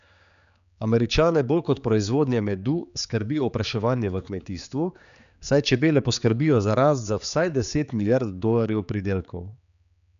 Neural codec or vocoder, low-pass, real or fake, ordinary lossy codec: codec, 16 kHz, 4 kbps, X-Codec, HuBERT features, trained on general audio; 7.2 kHz; fake; none